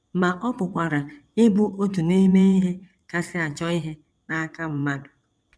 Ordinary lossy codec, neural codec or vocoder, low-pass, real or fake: none; vocoder, 22.05 kHz, 80 mel bands, WaveNeXt; none; fake